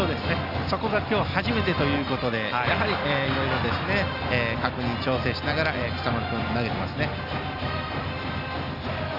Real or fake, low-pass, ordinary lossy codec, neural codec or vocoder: real; 5.4 kHz; none; none